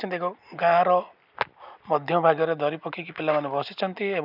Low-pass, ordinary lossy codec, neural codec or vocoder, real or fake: 5.4 kHz; none; none; real